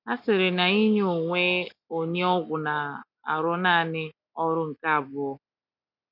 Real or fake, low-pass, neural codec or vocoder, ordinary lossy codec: real; 5.4 kHz; none; none